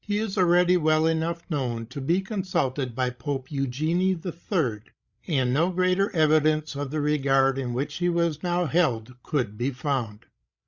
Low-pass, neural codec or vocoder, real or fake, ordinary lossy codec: 7.2 kHz; codec, 16 kHz, 16 kbps, FreqCodec, larger model; fake; Opus, 64 kbps